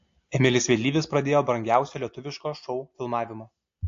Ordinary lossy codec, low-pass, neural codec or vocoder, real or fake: AAC, 48 kbps; 7.2 kHz; none; real